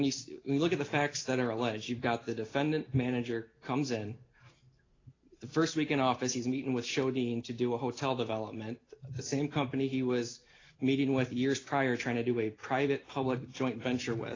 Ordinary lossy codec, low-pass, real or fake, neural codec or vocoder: AAC, 32 kbps; 7.2 kHz; real; none